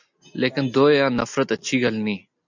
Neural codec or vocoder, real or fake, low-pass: none; real; 7.2 kHz